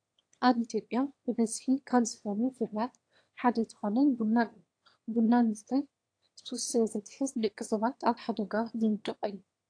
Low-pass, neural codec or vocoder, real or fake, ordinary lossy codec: 9.9 kHz; autoencoder, 22.05 kHz, a latent of 192 numbers a frame, VITS, trained on one speaker; fake; AAC, 48 kbps